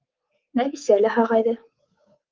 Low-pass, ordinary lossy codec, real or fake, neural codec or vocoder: 7.2 kHz; Opus, 24 kbps; fake; codec, 24 kHz, 3.1 kbps, DualCodec